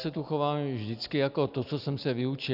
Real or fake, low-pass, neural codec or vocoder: real; 5.4 kHz; none